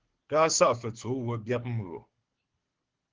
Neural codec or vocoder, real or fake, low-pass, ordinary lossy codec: codec, 24 kHz, 6 kbps, HILCodec; fake; 7.2 kHz; Opus, 16 kbps